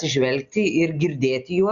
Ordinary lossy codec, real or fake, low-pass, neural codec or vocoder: Opus, 64 kbps; real; 7.2 kHz; none